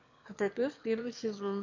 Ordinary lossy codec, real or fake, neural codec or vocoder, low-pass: AAC, 48 kbps; fake; autoencoder, 22.05 kHz, a latent of 192 numbers a frame, VITS, trained on one speaker; 7.2 kHz